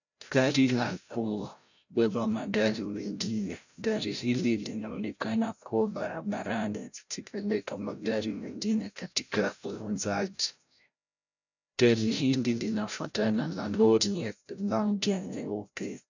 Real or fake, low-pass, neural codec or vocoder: fake; 7.2 kHz; codec, 16 kHz, 0.5 kbps, FreqCodec, larger model